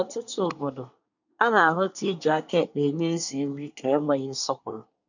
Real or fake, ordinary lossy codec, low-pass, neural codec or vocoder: fake; none; 7.2 kHz; codec, 24 kHz, 1 kbps, SNAC